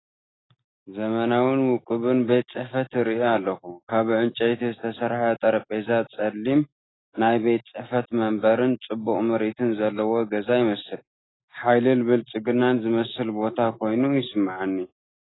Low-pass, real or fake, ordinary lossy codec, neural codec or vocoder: 7.2 kHz; real; AAC, 16 kbps; none